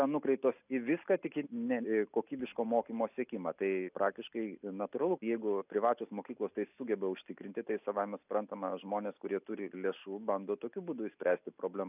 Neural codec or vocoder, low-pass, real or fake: none; 3.6 kHz; real